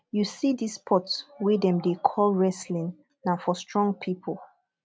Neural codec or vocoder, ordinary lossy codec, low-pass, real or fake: none; none; none; real